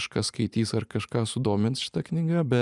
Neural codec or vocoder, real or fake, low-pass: vocoder, 44.1 kHz, 128 mel bands every 512 samples, BigVGAN v2; fake; 10.8 kHz